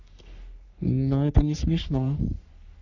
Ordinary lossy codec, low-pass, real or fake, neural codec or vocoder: none; 7.2 kHz; fake; codec, 44.1 kHz, 3.4 kbps, Pupu-Codec